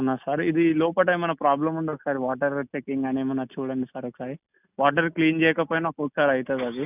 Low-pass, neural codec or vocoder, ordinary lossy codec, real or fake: 3.6 kHz; none; none; real